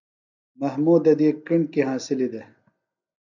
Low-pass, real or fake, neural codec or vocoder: 7.2 kHz; real; none